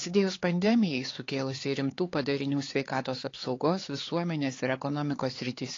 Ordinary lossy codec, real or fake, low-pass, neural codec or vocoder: AAC, 32 kbps; fake; 7.2 kHz; codec, 16 kHz, 4 kbps, X-Codec, HuBERT features, trained on LibriSpeech